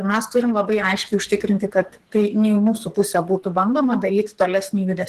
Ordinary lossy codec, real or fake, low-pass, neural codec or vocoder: Opus, 16 kbps; fake; 14.4 kHz; codec, 44.1 kHz, 2.6 kbps, SNAC